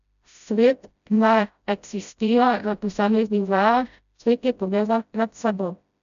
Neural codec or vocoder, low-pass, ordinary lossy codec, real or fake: codec, 16 kHz, 0.5 kbps, FreqCodec, smaller model; 7.2 kHz; none; fake